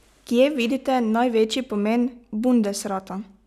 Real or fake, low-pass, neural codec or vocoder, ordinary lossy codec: real; 14.4 kHz; none; none